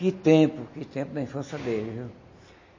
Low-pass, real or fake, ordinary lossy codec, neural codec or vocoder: 7.2 kHz; real; MP3, 32 kbps; none